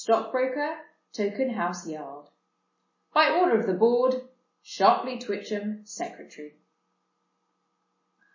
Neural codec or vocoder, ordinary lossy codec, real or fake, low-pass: none; MP3, 32 kbps; real; 7.2 kHz